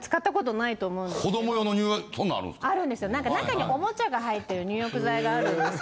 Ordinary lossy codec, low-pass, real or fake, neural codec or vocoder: none; none; real; none